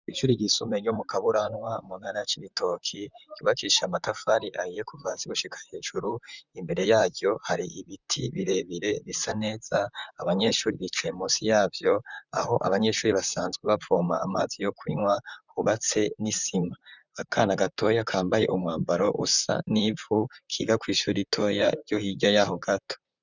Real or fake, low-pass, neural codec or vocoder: fake; 7.2 kHz; vocoder, 44.1 kHz, 128 mel bands, Pupu-Vocoder